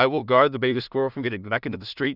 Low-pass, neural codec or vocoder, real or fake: 5.4 kHz; codec, 16 kHz in and 24 kHz out, 0.4 kbps, LongCat-Audio-Codec, two codebook decoder; fake